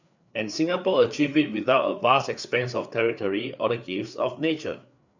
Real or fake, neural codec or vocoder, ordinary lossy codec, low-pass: fake; codec, 16 kHz, 4 kbps, FreqCodec, larger model; none; 7.2 kHz